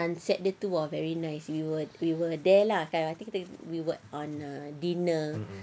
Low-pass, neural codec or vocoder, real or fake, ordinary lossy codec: none; none; real; none